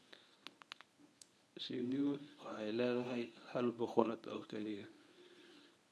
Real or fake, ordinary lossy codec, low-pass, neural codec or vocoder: fake; none; none; codec, 24 kHz, 0.9 kbps, WavTokenizer, medium speech release version 1